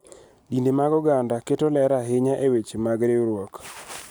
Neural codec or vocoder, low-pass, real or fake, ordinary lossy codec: none; none; real; none